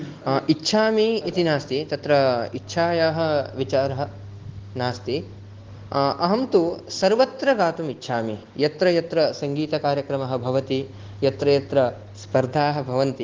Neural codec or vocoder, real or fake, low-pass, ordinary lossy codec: none; real; 7.2 kHz; Opus, 16 kbps